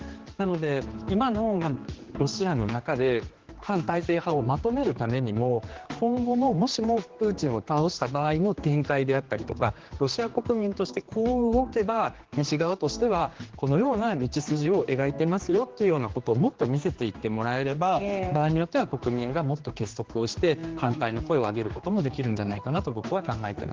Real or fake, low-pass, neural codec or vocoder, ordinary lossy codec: fake; 7.2 kHz; codec, 16 kHz, 2 kbps, X-Codec, HuBERT features, trained on general audio; Opus, 16 kbps